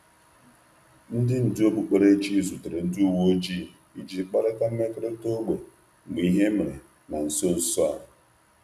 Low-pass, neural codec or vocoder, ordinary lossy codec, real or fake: 14.4 kHz; none; none; real